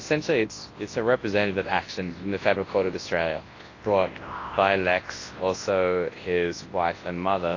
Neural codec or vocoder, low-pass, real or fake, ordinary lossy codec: codec, 24 kHz, 0.9 kbps, WavTokenizer, large speech release; 7.2 kHz; fake; AAC, 32 kbps